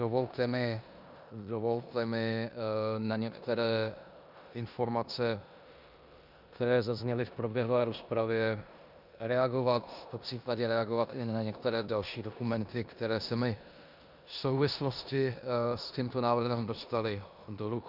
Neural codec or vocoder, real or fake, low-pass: codec, 16 kHz in and 24 kHz out, 0.9 kbps, LongCat-Audio-Codec, four codebook decoder; fake; 5.4 kHz